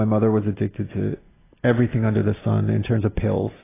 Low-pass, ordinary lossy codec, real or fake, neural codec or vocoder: 3.6 kHz; AAC, 16 kbps; real; none